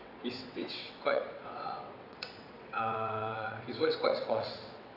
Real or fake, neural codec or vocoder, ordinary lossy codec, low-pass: fake; vocoder, 22.05 kHz, 80 mel bands, WaveNeXt; none; 5.4 kHz